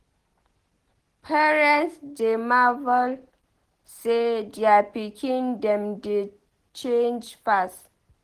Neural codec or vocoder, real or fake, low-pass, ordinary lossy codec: none; real; 14.4 kHz; Opus, 16 kbps